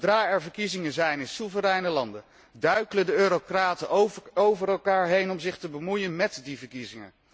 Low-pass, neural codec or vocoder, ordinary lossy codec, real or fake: none; none; none; real